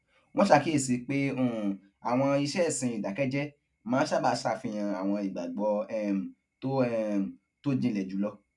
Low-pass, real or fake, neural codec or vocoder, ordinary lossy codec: 10.8 kHz; real; none; none